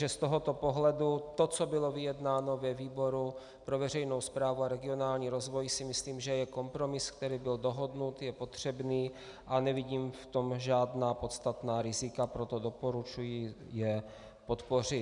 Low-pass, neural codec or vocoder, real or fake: 10.8 kHz; none; real